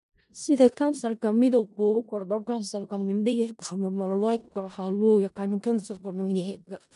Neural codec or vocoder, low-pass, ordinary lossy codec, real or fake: codec, 16 kHz in and 24 kHz out, 0.4 kbps, LongCat-Audio-Codec, four codebook decoder; 10.8 kHz; none; fake